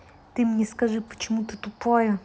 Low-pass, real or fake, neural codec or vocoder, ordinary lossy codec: none; real; none; none